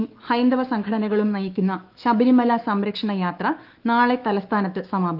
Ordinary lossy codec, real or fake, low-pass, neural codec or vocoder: Opus, 32 kbps; fake; 5.4 kHz; autoencoder, 48 kHz, 128 numbers a frame, DAC-VAE, trained on Japanese speech